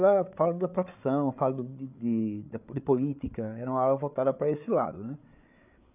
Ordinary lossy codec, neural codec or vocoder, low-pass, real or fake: none; codec, 16 kHz, 8 kbps, FreqCodec, larger model; 3.6 kHz; fake